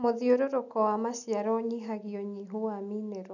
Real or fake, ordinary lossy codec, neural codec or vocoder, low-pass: real; none; none; 7.2 kHz